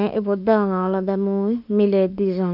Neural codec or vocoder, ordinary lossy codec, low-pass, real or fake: autoencoder, 48 kHz, 32 numbers a frame, DAC-VAE, trained on Japanese speech; none; 5.4 kHz; fake